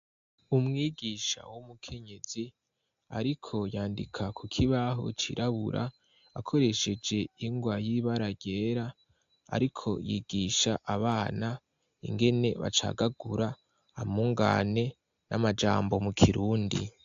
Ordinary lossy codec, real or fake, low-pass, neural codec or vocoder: AAC, 64 kbps; real; 7.2 kHz; none